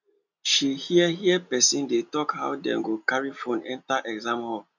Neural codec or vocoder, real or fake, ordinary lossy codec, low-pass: none; real; none; 7.2 kHz